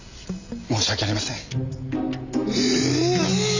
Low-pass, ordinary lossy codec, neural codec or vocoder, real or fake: 7.2 kHz; Opus, 64 kbps; none; real